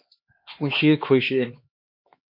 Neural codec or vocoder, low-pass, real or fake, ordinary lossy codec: codec, 16 kHz, 2 kbps, X-Codec, HuBERT features, trained on LibriSpeech; 5.4 kHz; fake; MP3, 32 kbps